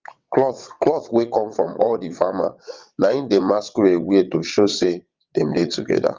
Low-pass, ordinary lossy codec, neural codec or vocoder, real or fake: 7.2 kHz; Opus, 32 kbps; vocoder, 22.05 kHz, 80 mel bands, WaveNeXt; fake